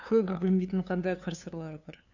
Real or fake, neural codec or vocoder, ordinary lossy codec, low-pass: fake; codec, 16 kHz, 2 kbps, FunCodec, trained on LibriTTS, 25 frames a second; none; 7.2 kHz